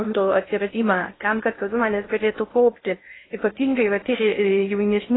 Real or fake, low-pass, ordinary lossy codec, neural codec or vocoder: fake; 7.2 kHz; AAC, 16 kbps; codec, 16 kHz in and 24 kHz out, 0.6 kbps, FocalCodec, streaming, 2048 codes